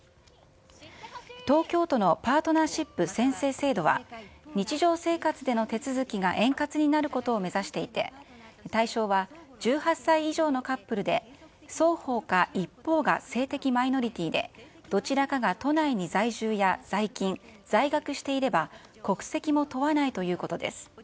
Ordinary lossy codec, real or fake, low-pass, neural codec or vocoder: none; real; none; none